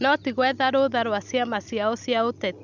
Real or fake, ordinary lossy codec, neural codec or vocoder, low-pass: real; none; none; 7.2 kHz